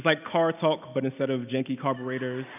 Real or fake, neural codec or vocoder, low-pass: real; none; 3.6 kHz